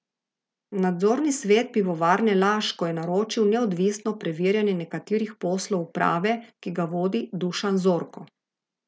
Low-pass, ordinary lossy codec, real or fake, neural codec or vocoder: none; none; real; none